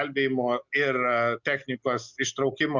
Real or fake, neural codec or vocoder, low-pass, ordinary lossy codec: real; none; 7.2 kHz; Opus, 64 kbps